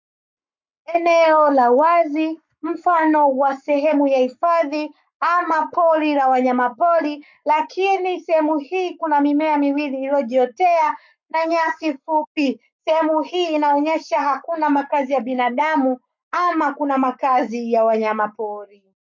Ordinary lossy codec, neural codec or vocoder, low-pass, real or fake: MP3, 48 kbps; codec, 44.1 kHz, 7.8 kbps, Pupu-Codec; 7.2 kHz; fake